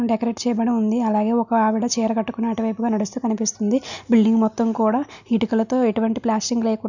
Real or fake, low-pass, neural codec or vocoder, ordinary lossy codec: real; 7.2 kHz; none; none